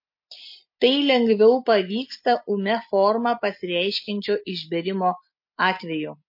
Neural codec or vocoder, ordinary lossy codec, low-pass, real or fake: none; MP3, 32 kbps; 5.4 kHz; real